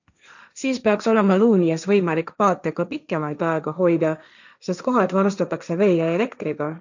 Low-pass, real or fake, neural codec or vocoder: 7.2 kHz; fake; codec, 16 kHz, 1.1 kbps, Voila-Tokenizer